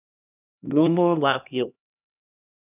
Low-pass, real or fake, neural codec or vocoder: 3.6 kHz; fake; codec, 16 kHz, 1 kbps, X-Codec, HuBERT features, trained on LibriSpeech